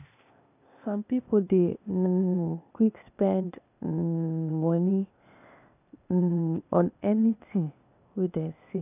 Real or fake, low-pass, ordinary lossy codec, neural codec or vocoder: fake; 3.6 kHz; none; codec, 16 kHz, 0.8 kbps, ZipCodec